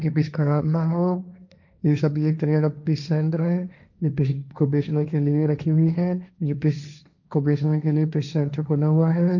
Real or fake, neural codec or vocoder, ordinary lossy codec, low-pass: fake; codec, 16 kHz, 1.1 kbps, Voila-Tokenizer; none; 7.2 kHz